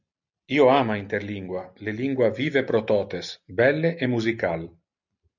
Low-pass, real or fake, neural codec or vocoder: 7.2 kHz; real; none